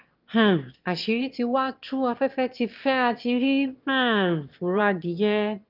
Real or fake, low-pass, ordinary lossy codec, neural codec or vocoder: fake; 5.4 kHz; Opus, 32 kbps; autoencoder, 22.05 kHz, a latent of 192 numbers a frame, VITS, trained on one speaker